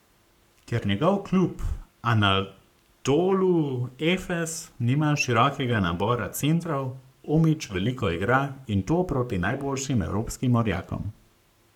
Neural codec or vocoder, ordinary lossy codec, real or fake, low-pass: codec, 44.1 kHz, 7.8 kbps, Pupu-Codec; none; fake; 19.8 kHz